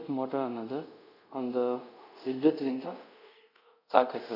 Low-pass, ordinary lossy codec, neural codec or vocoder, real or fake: 5.4 kHz; none; codec, 24 kHz, 0.5 kbps, DualCodec; fake